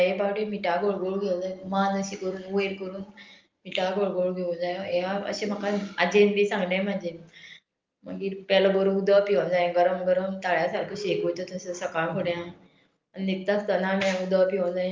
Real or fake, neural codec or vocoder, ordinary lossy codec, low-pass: real; none; Opus, 24 kbps; 7.2 kHz